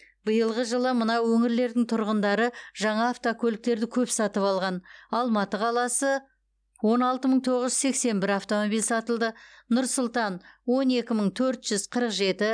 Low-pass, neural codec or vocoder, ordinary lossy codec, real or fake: 9.9 kHz; none; none; real